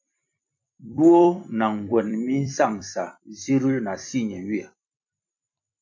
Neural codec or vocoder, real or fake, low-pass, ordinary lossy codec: vocoder, 44.1 kHz, 128 mel bands every 256 samples, BigVGAN v2; fake; 7.2 kHz; MP3, 48 kbps